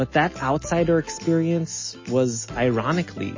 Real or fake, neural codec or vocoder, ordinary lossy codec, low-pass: real; none; MP3, 32 kbps; 7.2 kHz